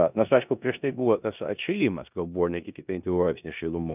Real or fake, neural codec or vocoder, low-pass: fake; codec, 16 kHz in and 24 kHz out, 0.9 kbps, LongCat-Audio-Codec, four codebook decoder; 3.6 kHz